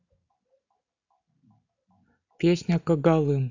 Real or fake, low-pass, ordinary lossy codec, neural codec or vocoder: real; 7.2 kHz; AAC, 48 kbps; none